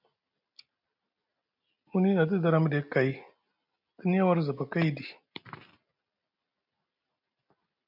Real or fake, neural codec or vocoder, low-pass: real; none; 5.4 kHz